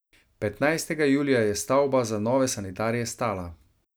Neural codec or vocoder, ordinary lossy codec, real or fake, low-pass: none; none; real; none